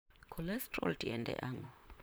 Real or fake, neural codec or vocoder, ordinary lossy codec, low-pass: fake; vocoder, 44.1 kHz, 128 mel bands, Pupu-Vocoder; none; none